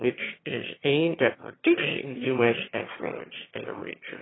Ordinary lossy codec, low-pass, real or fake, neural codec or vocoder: AAC, 16 kbps; 7.2 kHz; fake; autoencoder, 22.05 kHz, a latent of 192 numbers a frame, VITS, trained on one speaker